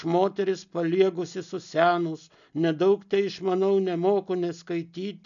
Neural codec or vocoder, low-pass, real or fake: none; 7.2 kHz; real